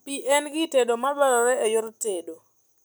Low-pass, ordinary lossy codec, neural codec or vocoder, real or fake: none; none; none; real